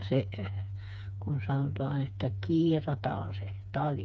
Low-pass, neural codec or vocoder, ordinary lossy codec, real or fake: none; codec, 16 kHz, 4 kbps, FreqCodec, smaller model; none; fake